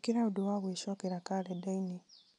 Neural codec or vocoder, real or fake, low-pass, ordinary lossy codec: none; real; 10.8 kHz; none